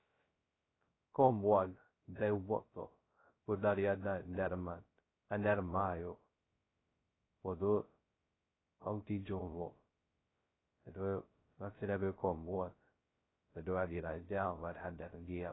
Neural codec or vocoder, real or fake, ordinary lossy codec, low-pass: codec, 16 kHz, 0.2 kbps, FocalCodec; fake; AAC, 16 kbps; 7.2 kHz